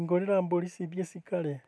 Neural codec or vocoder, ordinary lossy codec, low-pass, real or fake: none; none; none; real